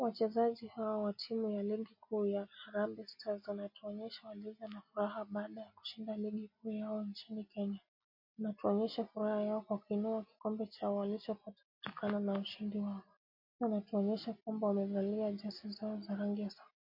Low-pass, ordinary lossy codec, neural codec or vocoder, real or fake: 5.4 kHz; MP3, 32 kbps; none; real